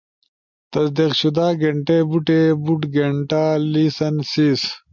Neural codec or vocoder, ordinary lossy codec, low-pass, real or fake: none; MP3, 64 kbps; 7.2 kHz; real